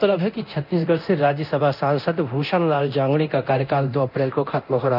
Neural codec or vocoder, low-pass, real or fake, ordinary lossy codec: codec, 24 kHz, 0.9 kbps, DualCodec; 5.4 kHz; fake; none